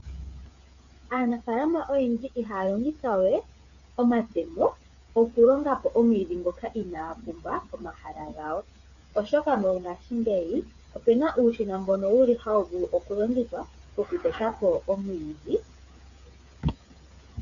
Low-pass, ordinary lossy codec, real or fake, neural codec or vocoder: 7.2 kHz; MP3, 96 kbps; fake; codec, 16 kHz, 8 kbps, FreqCodec, smaller model